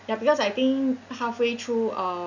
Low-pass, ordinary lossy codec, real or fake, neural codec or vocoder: 7.2 kHz; none; real; none